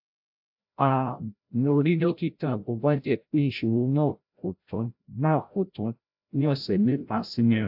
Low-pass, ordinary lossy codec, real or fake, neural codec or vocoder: 5.4 kHz; AAC, 48 kbps; fake; codec, 16 kHz, 0.5 kbps, FreqCodec, larger model